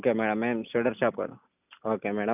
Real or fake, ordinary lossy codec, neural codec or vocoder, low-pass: real; none; none; 3.6 kHz